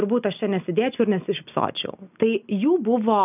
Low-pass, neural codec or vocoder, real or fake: 3.6 kHz; none; real